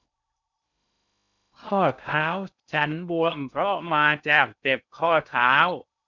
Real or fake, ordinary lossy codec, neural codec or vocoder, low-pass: fake; none; codec, 16 kHz in and 24 kHz out, 0.6 kbps, FocalCodec, streaming, 2048 codes; 7.2 kHz